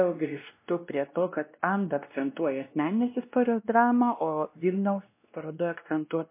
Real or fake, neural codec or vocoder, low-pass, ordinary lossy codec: fake; codec, 16 kHz, 1 kbps, X-Codec, WavLM features, trained on Multilingual LibriSpeech; 3.6 kHz; MP3, 24 kbps